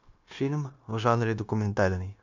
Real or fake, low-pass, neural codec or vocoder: fake; 7.2 kHz; codec, 16 kHz, 0.9 kbps, LongCat-Audio-Codec